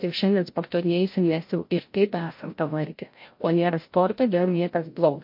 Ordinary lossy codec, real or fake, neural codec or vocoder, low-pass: MP3, 32 kbps; fake; codec, 16 kHz, 0.5 kbps, FreqCodec, larger model; 5.4 kHz